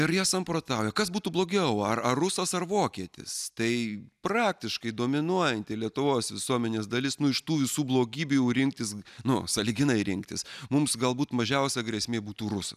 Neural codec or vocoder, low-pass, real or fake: none; 14.4 kHz; real